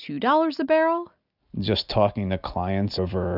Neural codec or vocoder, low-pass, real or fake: none; 5.4 kHz; real